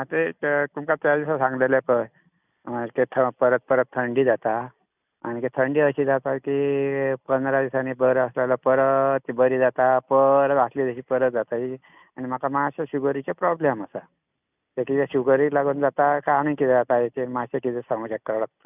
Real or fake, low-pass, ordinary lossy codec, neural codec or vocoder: real; 3.6 kHz; none; none